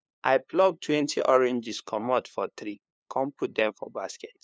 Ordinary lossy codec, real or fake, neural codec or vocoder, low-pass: none; fake; codec, 16 kHz, 2 kbps, FunCodec, trained on LibriTTS, 25 frames a second; none